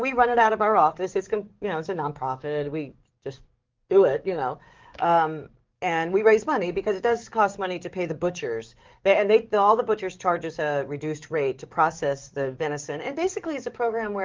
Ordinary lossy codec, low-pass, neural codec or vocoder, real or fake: Opus, 32 kbps; 7.2 kHz; codec, 44.1 kHz, 7.8 kbps, DAC; fake